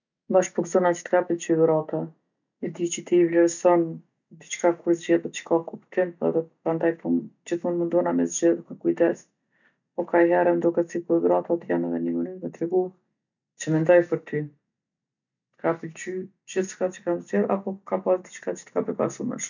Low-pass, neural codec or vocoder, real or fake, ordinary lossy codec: 7.2 kHz; none; real; none